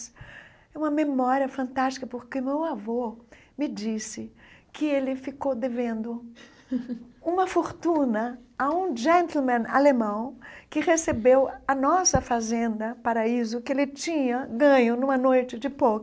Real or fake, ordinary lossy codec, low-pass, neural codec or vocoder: real; none; none; none